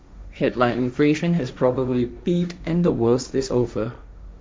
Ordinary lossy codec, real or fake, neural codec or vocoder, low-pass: none; fake; codec, 16 kHz, 1.1 kbps, Voila-Tokenizer; none